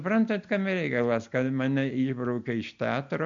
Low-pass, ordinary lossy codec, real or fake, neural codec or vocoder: 7.2 kHz; AAC, 64 kbps; real; none